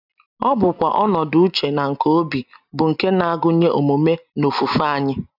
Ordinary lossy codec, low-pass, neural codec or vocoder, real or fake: none; 5.4 kHz; none; real